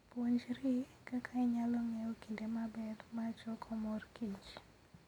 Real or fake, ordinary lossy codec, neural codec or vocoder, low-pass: real; none; none; 19.8 kHz